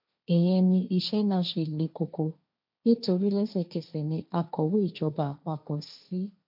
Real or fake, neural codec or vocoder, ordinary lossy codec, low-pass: fake; codec, 16 kHz, 1.1 kbps, Voila-Tokenizer; MP3, 48 kbps; 5.4 kHz